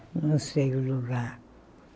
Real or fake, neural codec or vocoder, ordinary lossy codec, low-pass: real; none; none; none